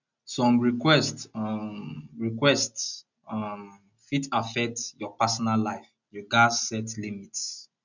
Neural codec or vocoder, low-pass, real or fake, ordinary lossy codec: none; 7.2 kHz; real; none